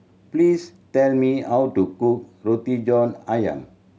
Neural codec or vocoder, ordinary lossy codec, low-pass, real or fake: none; none; none; real